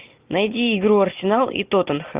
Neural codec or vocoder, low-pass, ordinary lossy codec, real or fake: none; 3.6 kHz; Opus, 24 kbps; real